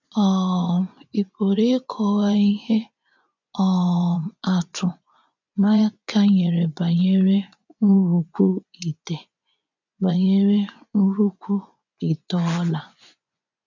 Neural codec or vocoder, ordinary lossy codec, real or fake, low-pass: vocoder, 24 kHz, 100 mel bands, Vocos; none; fake; 7.2 kHz